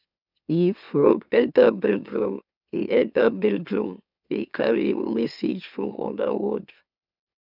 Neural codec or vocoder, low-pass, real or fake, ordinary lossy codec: autoencoder, 44.1 kHz, a latent of 192 numbers a frame, MeloTTS; 5.4 kHz; fake; none